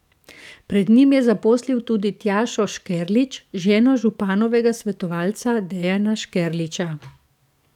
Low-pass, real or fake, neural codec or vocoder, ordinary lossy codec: 19.8 kHz; fake; codec, 44.1 kHz, 7.8 kbps, DAC; none